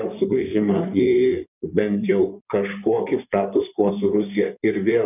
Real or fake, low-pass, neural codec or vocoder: fake; 3.6 kHz; codec, 16 kHz in and 24 kHz out, 2.2 kbps, FireRedTTS-2 codec